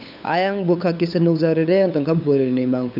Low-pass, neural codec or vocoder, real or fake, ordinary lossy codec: 5.4 kHz; codec, 16 kHz, 8 kbps, FunCodec, trained on LibriTTS, 25 frames a second; fake; none